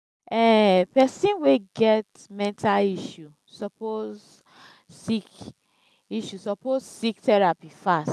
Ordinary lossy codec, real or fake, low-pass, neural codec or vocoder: none; real; none; none